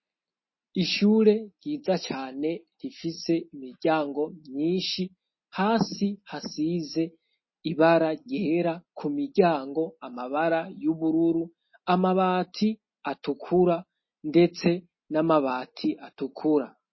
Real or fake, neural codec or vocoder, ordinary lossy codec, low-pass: real; none; MP3, 24 kbps; 7.2 kHz